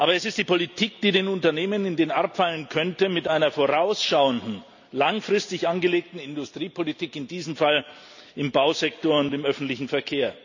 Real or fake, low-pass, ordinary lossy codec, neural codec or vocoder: real; 7.2 kHz; none; none